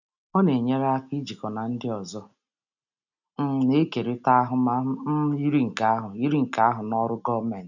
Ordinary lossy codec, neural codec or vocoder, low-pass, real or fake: none; none; 7.2 kHz; real